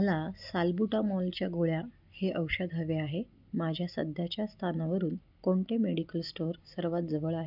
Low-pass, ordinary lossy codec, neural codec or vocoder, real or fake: 5.4 kHz; none; none; real